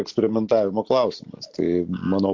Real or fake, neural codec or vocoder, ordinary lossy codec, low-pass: real; none; MP3, 48 kbps; 7.2 kHz